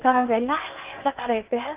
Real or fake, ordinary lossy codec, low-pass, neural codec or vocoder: fake; Opus, 24 kbps; 3.6 kHz; codec, 16 kHz in and 24 kHz out, 0.8 kbps, FocalCodec, streaming, 65536 codes